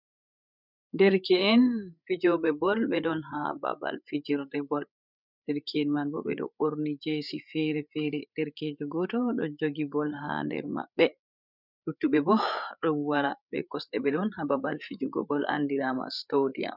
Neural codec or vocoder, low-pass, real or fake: codec, 16 kHz, 8 kbps, FreqCodec, larger model; 5.4 kHz; fake